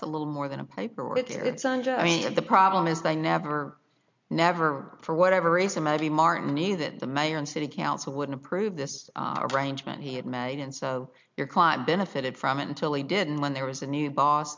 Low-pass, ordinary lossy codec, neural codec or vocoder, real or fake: 7.2 kHz; MP3, 64 kbps; none; real